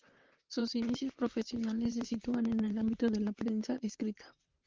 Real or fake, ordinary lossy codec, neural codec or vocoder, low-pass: fake; Opus, 32 kbps; vocoder, 44.1 kHz, 128 mel bands, Pupu-Vocoder; 7.2 kHz